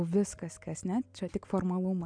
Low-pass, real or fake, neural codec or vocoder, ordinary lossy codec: 9.9 kHz; real; none; MP3, 96 kbps